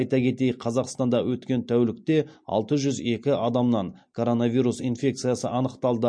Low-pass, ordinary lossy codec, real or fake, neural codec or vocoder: 9.9 kHz; MP3, 48 kbps; real; none